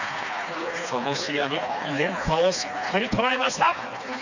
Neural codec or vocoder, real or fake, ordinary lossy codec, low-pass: codec, 16 kHz, 2 kbps, FreqCodec, smaller model; fake; none; 7.2 kHz